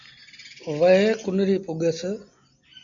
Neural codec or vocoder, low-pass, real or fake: none; 7.2 kHz; real